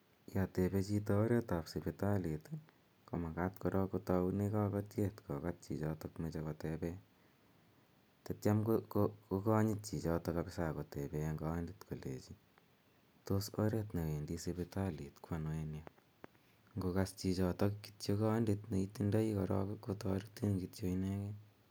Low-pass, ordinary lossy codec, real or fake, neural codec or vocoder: none; none; real; none